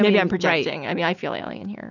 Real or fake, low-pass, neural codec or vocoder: real; 7.2 kHz; none